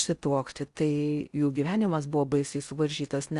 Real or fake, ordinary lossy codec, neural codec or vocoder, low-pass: fake; Opus, 64 kbps; codec, 16 kHz in and 24 kHz out, 0.6 kbps, FocalCodec, streaming, 4096 codes; 10.8 kHz